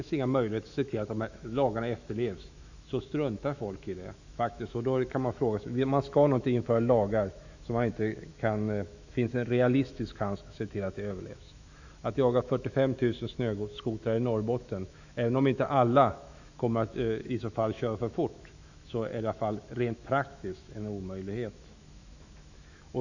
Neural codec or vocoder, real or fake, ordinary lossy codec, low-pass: autoencoder, 48 kHz, 128 numbers a frame, DAC-VAE, trained on Japanese speech; fake; none; 7.2 kHz